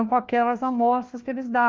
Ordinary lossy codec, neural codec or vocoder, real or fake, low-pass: Opus, 32 kbps; codec, 16 kHz, 1 kbps, FunCodec, trained on LibriTTS, 50 frames a second; fake; 7.2 kHz